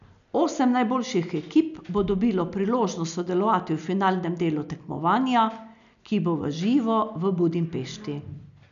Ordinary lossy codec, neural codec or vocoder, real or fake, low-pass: none; none; real; 7.2 kHz